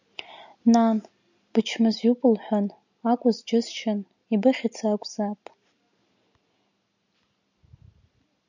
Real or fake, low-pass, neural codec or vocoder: real; 7.2 kHz; none